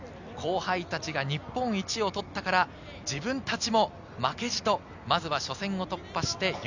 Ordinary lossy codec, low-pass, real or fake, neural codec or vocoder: none; 7.2 kHz; real; none